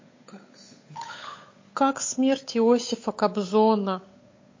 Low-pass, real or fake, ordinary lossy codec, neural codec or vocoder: 7.2 kHz; fake; MP3, 32 kbps; codec, 16 kHz, 8 kbps, FunCodec, trained on Chinese and English, 25 frames a second